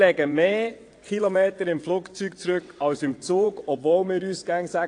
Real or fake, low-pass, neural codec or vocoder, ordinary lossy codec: fake; 9.9 kHz; vocoder, 22.05 kHz, 80 mel bands, WaveNeXt; AAC, 48 kbps